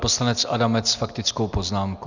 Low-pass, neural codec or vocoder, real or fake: 7.2 kHz; none; real